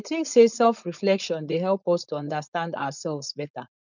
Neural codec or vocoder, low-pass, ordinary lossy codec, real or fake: codec, 16 kHz, 4.8 kbps, FACodec; 7.2 kHz; none; fake